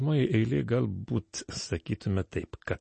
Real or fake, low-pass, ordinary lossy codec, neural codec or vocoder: real; 9.9 kHz; MP3, 32 kbps; none